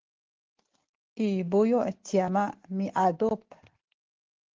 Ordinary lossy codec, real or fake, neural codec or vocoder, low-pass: Opus, 16 kbps; real; none; 7.2 kHz